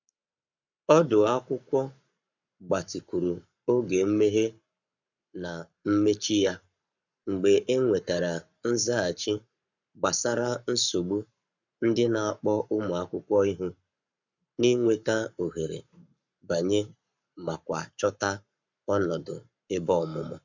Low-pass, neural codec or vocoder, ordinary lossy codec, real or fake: 7.2 kHz; codec, 44.1 kHz, 7.8 kbps, Pupu-Codec; none; fake